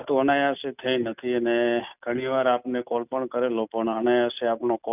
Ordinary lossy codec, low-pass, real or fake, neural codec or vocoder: none; 3.6 kHz; real; none